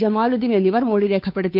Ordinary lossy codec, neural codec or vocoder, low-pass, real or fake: none; codec, 16 kHz, 2 kbps, FunCodec, trained on Chinese and English, 25 frames a second; 5.4 kHz; fake